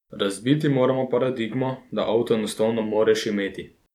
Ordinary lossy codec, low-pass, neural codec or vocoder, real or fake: none; 19.8 kHz; vocoder, 44.1 kHz, 128 mel bands every 256 samples, BigVGAN v2; fake